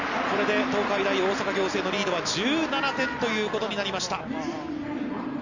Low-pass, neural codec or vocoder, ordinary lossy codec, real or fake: 7.2 kHz; none; none; real